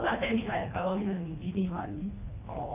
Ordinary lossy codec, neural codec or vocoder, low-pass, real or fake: AAC, 32 kbps; codec, 24 kHz, 1.5 kbps, HILCodec; 3.6 kHz; fake